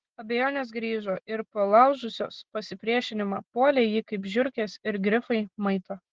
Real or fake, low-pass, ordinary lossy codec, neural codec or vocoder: real; 7.2 kHz; Opus, 16 kbps; none